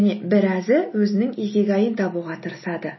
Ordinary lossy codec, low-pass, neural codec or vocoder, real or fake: MP3, 24 kbps; 7.2 kHz; none; real